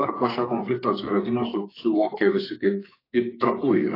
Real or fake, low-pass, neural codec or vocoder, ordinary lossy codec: fake; 5.4 kHz; codec, 44.1 kHz, 2.6 kbps, SNAC; AAC, 24 kbps